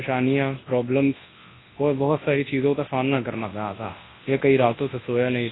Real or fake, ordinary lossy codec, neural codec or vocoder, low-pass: fake; AAC, 16 kbps; codec, 24 kHz, 0.9 kbps, WavTokenizer, large speech release; 7.2 kHz